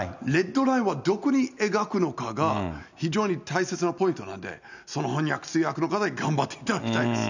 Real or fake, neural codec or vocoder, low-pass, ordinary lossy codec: real; none; 7.2 kHz; none